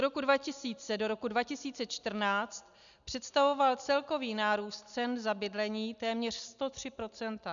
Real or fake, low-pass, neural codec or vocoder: real; 7.2 kHz; none